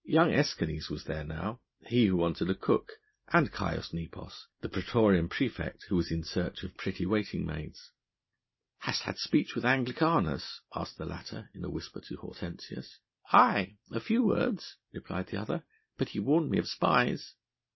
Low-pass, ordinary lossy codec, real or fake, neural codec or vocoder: 7.2 kHz; MP3, 24 kbps; real; none